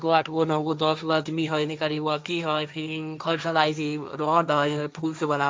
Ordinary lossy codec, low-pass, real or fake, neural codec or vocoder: none; none; fake; codec, 16 kHz, 1.1 kbps, Voila-Tokenizer